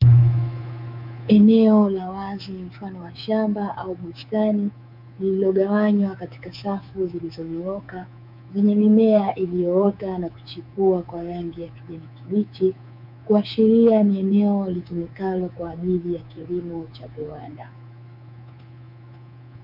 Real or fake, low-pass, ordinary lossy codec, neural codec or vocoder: fake; 5.4 kHz; MP3, 48 kbps; codec, 24 kHz, 3.1 kbps, DualCodec